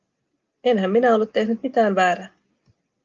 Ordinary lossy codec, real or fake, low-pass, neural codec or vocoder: Opus, 24 kbps; real; 7.2 kHz; none